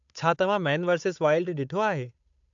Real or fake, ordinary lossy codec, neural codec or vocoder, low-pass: fake; AAC, 64 kbps; codec, 16 kHz, 8 kbps, FunCodec, trained on Chinese and English, 25 frames a second; 7.2 kHz